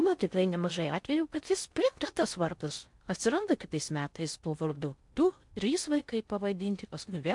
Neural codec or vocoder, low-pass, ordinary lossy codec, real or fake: codec, 16 kHz in and 24 kHz out, 0.6 kbps, FocalCodec, streaming, 4096 codes; 10.8 kHz; MP3, 64 kbps; fake